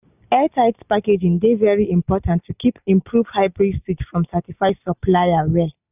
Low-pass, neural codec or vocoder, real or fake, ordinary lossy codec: 3.6 kHz; none; real; none